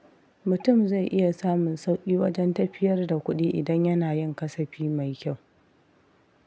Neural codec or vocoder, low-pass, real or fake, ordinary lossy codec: none; none; real; none